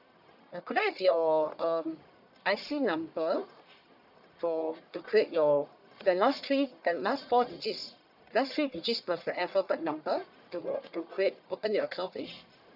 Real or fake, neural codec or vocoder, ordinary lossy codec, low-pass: fake; codec, 44.1 kHz, 1.7 kbps, Pupu-Codec; none; 5.4 kHz